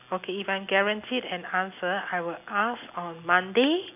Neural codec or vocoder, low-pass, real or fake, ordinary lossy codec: none; 3.6 kHz; real; none